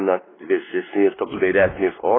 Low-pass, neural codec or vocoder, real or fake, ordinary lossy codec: 7.2 kHz; codec, 16 kHz, 2 kbps, X-Codec, WavLM features, trained on Multilingual LibriSpeech; fake; AAC, 16 kbps